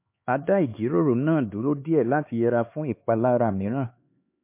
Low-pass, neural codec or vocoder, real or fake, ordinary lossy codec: 3.6 kHz; codec, 16 kHz, 4 kbps, X-Codec, HuBERT features, trained on LibriSpeech; fake; MP3, 24 kbps